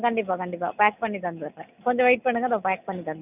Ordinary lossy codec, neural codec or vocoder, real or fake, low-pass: none; none; real; 3.6 kHz